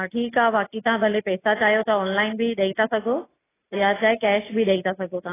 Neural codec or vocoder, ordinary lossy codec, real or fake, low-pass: none; AAC, 16 kbps; real; 3.6 kHz